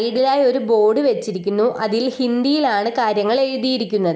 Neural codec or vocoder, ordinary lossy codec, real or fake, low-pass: none; none; real; none